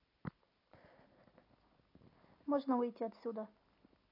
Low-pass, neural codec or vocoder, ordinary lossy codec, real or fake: 5.4 kHz; none; AAC, 32 kbps; real